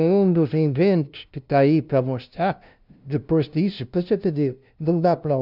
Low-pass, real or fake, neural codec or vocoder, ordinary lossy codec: 5.4 kHz; fake; codec, 16 kHz, 0.5 kbps, FunCodec, trained on LibriTTS, 25 frames a second; none